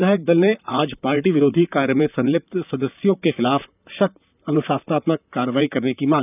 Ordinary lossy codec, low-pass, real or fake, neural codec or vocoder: none; 3.6 kHz; fake; vocoder, 44.1 kHz, 128 mel bands, Pupu-Vocoder